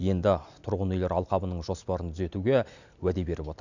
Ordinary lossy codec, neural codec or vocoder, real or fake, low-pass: none; none; real; 7.2 kHz